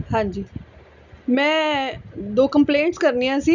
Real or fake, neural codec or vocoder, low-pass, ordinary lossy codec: real; none; 7.2 kHz; none